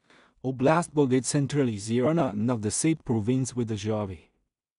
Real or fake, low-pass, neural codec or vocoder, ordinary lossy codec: fake; 10.8 kHz; codec, 16 kHz in and 24 kHz out, 0.4 kbps, LongCat-Audio-Codec, two codebook decoder; none